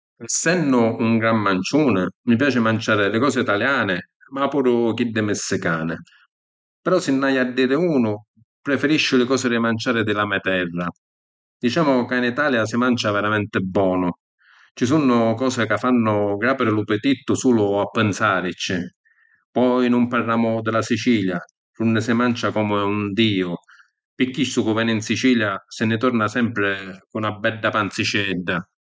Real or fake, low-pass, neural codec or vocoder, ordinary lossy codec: real; none; none; none